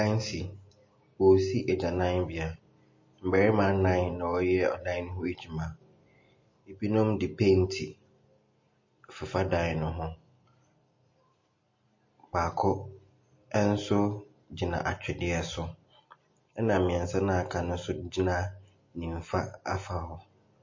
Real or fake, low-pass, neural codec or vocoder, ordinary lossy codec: real; 7.2 kHz; none; MP3, 32 kbps